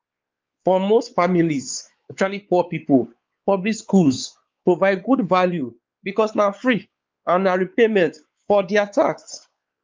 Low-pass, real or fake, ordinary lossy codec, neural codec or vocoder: 7.2 kHz; fake; Opus, 24 kbps; codec, 16 kHz, 4 kbps, X-Codec, WavLM features, trained on Multilingual LibriSpeech